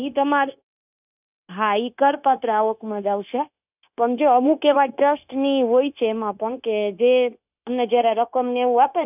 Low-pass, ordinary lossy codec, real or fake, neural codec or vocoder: 3.6 kHz; none; fake; codec, 16 kHz, 0.9 kbps, LongCat-Audio-Codec